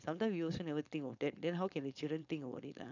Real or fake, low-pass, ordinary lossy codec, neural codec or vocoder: fake; 7.2 kHz; none; codec, 16 kHz, 4.8 kbps, FACodec